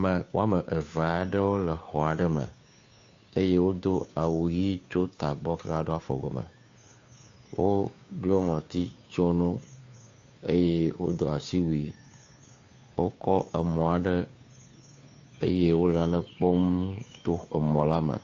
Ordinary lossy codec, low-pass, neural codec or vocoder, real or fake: AAC, 48 kbps; 7.2 kHz; codec, 16 kHz, 2 kbps, FunCodec, trained on Chinese and English, 25 frames a second; fake